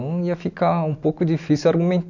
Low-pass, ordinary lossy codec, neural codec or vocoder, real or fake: 7.2 kHz; none; none; real